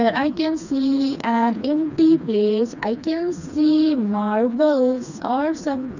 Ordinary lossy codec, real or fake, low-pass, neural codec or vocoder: none; fake; 7.2 kHz; codec, 16 kHz, 2 kbps, FreqCodec, smaller model